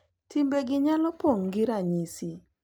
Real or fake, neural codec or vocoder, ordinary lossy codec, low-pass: real; none; none; 19.8 kHz